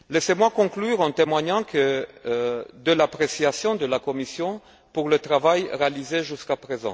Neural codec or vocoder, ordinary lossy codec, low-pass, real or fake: none; none; none; real